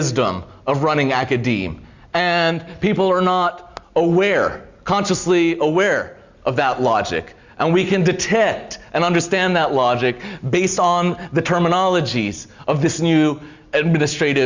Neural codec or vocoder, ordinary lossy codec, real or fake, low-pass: none; Opus, 64 kbps; real; 7.2 kHz